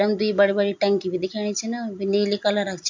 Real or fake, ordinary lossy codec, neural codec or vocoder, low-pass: real; MP3, 48 kbps; none; 7.2 kHz